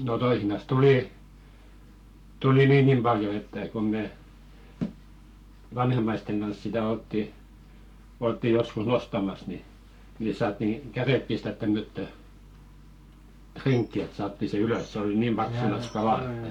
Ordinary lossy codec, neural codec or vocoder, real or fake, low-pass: none; codec, 44.1 kHz, 7.8 kbps, Pupu-Codec; fake; 19.8 kHz